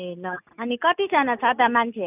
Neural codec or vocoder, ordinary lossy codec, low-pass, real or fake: none; none; 3.6 kHz; real